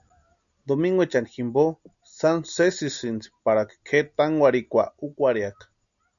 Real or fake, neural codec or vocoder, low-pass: real; none; 7.2 kHz